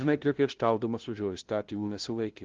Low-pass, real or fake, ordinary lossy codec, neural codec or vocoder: 7.2 kHz; fake; Opus, 16 kbps; codec, 16 kHz, 0.5 kbps, FunCodec, trained on LibriTTS, 25 frames a second